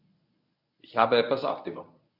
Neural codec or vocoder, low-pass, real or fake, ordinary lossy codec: codec, 24 kHz, 0.9 kbps, WavTokenizer, medium speech release version 1; 5.4 kHz; fake; none